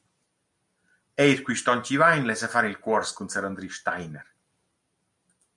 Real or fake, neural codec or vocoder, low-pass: real; none; 10.8 kHz